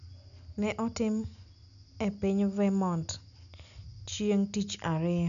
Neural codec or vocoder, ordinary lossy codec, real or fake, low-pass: none; none; real; 7.2 kHz